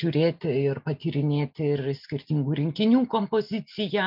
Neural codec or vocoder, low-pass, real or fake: none; 5.4 kHz; real